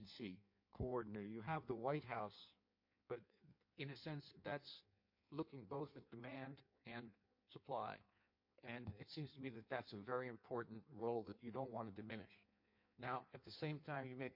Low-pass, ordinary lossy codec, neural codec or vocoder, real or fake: 5.4 kHz; MP3, 32 kbps; codec, 16 kHz in and 24 kHz out, 1.1 kbps, FireRedTTS-2 codec; fake